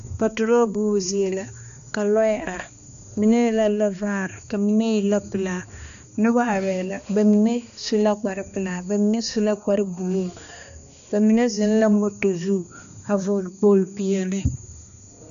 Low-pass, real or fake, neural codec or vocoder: 7.2 kHz; fake; codec, 16 kHz, 2 kbps, X-Codec, HuBERT features, trained on balanced general audio